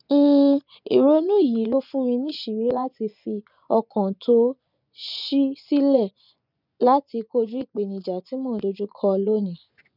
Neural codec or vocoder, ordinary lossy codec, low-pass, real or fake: vocoder, 24 kHz, 100 mel bands, Vocos; none; 5.4 kHz; fake